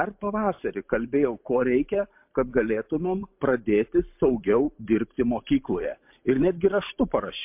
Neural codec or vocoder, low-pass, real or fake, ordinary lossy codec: vocoder, 44.1 kHz, 128 mel bands every 512 samples, BigVGAN v2; 3.6 kHz; fake; MP3, 32 kbps